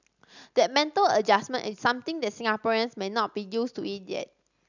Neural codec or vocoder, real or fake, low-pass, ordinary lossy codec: none; real; 7.2 kHz; none